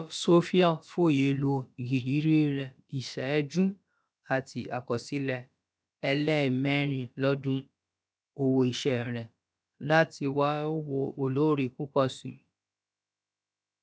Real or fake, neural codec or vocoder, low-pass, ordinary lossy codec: fake; codec, 16 kHz, about 1 kbps, DyCAST, with the encoder's durations; none; none